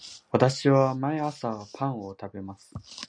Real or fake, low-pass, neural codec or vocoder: real; 9.9 kHz; none